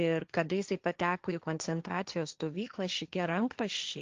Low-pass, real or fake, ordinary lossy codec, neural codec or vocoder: 7.2 kHz; fake; Opus, 32 kbps; codec, 16 kHz, 1.1 kbps, Voila-Tokenizer